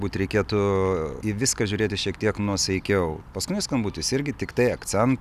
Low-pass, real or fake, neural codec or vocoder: 14.4 kHz; real; none